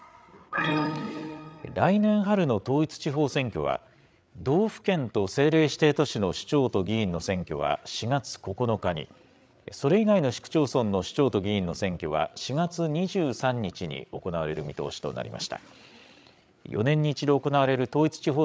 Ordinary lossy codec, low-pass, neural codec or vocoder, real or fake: none; none; codec, 16 kHz, 8 kbps, FreqCodec, larger model; fake